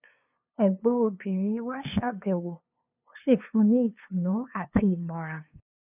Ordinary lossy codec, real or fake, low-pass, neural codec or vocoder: none; fake; 3.6 kHz; codec, 16 kHz, 2 kbps, FunCodec, trained on LibriTTS, 25 frames a second